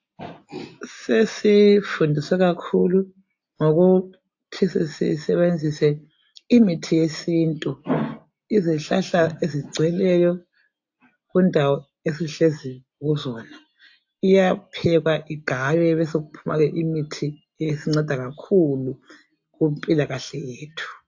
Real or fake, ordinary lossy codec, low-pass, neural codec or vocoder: real; AAC, 48 kbps; 7.2 kHz; none